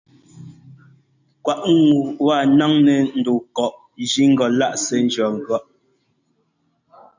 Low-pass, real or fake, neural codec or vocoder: 7.2 kHz; real; none